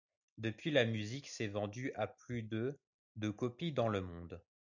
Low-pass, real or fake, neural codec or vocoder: 7.2 kHz; real; none